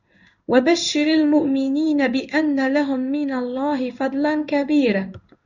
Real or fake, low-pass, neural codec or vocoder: fake; 7.2 kHz; codec, 16 kHz in and 24 kHz out, 1 kbps, XY-Tokenizer